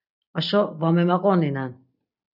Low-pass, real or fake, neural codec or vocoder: 5.4 kHz; real; none